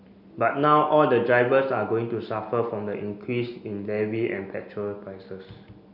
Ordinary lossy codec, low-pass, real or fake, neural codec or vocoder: none; 5.4 kHz; real; none